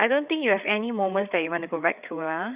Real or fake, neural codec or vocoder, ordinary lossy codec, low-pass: fake; codec, 16 kHz, 8 kbps, FreqCodec, larger model; Opus, 64 kbps; 3.6 kHz